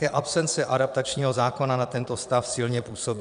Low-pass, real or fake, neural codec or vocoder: 9.9 kHz; fake; vocoder, 22.05 kHz, 80 mel bands, Vocos